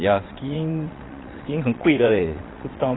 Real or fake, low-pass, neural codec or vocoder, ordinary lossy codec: fake; 7.2 kHz; vocoder, 22.05 kHz, 80 mel bands, WaveNeXt; AAC, 16 kbps